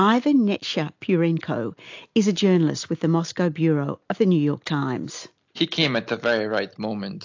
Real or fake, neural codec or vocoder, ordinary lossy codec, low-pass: real; none; AAC, 48 kbps; 7.2 kHz